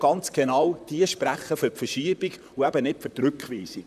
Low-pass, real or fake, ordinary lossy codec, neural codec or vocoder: 14.4 kHz; fake; none; vocoder, 44.1 kHz, 128 mel bands, Pupu-Vocoder